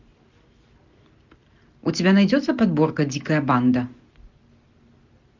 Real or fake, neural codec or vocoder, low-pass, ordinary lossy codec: real; none; 7.2 kHz; MP3, 64 kbps